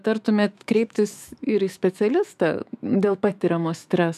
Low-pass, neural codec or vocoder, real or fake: 14.4 kHz; autoencoder, 48 kHz, 128 numbers a frame, DAC-VAE, trained on Japanese speech; fake